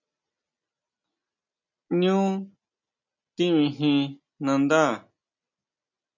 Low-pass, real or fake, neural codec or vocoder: 7.2 kHz; real; none